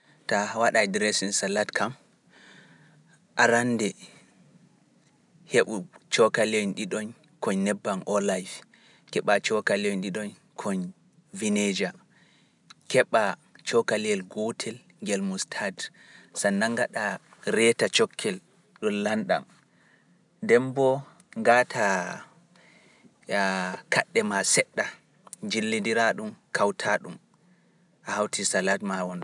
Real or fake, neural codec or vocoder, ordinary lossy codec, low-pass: real; none; none; 10.8 kHz